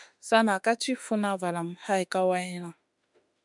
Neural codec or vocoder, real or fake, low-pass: autoencoder, 48 kHz, 32 numbers a frame, DAC-VAE, trained on Japanese speech; fake; 10.8 kHz